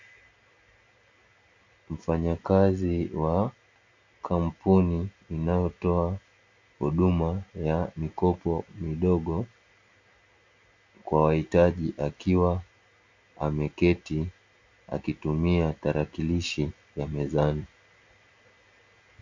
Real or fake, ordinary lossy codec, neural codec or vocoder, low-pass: real; MP3, 64 kbps; none; 7.2 kHz